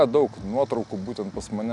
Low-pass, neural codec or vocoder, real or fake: 10.8 kHz; none; real